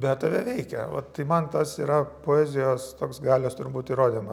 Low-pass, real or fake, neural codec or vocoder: 19.8 kHz; real; none